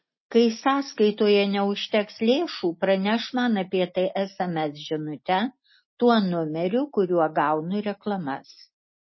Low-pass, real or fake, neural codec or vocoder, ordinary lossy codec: 7.2 kHz; real; none; MP3, 24 kbps